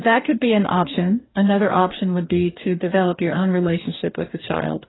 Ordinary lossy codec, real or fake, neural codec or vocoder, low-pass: AAC, 16 kbps; fake; codec, 44.1 kHz, 2.6 kbps, DAC; 7.2 kHz